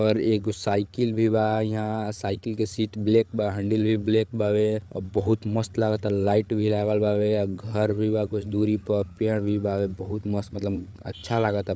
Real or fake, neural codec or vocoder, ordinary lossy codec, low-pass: fake; codec, 16 kHz, 8 kbps, FreqCodec, larger model; none; none